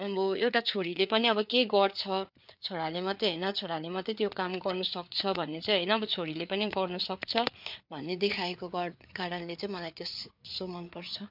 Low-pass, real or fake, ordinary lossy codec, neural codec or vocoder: 5.4 kHz; fake; MP3, 48 kbps; codec, 16 kHz, 4 kbps, FreqCodec, larger model